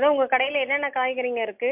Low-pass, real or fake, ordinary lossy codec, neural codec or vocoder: 3.6 kHz; real; MP3, 32 kbps; none